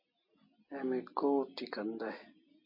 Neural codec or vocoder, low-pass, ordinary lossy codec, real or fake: none; 5.4 kHz; MP3, 32 kbps; real